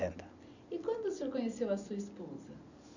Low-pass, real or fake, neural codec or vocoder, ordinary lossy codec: 7.2 kHz; real; none; none